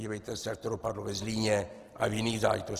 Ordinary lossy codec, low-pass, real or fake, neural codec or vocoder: Opus, 16 kbps; 14.4 kHz; real; none